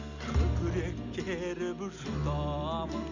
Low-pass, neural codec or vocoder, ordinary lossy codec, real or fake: 7.2 kHz; none; none; real